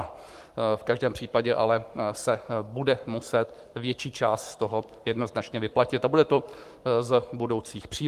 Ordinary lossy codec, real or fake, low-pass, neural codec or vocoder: Opus, 32 kbps; fake; 14.4 kHz; codec, 44.1 kHz, 7.8 kbps, Pupu-Codec